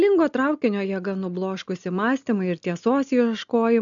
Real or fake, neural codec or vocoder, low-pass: real; none; 7.2 kHz